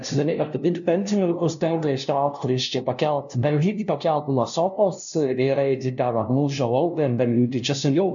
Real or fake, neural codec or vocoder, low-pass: fake; codec, 16 kHz, 0.5 kbps, FunCodec, trained on LibriTTS, 25 frames a second; 7.2 kHz